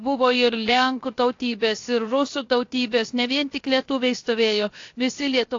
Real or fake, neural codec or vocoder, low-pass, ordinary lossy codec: fake; codec, 16 kHz, 0.7 kbps, FocalCodec; 7.2 kHz; AAC, 48 kbps